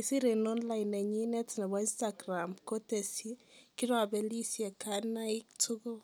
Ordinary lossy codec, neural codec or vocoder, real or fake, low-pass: none; none; real; none